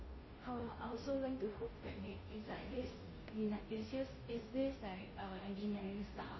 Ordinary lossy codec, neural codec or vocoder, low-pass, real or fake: MP3, 24 kbps; codec, 16 kHz, 0.5 kbps, FunCodec, trained on Chinese and English, 25 frames a second; 7.2 kHz; fake